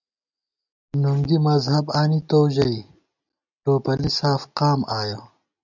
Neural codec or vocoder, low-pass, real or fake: none; 7.2 kHz; real